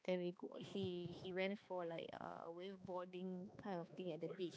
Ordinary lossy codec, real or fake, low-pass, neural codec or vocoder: none; fake; none; codec, 16 kHz, 2 kbps, X-Codec, HuBERT features, trained on balanced general audio